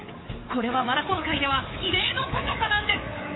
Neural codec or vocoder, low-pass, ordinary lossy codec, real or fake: codec, 24 kHz, 3.1 kbps, DualCodec; 7.2 kHz; AAC, 16 kbps; fake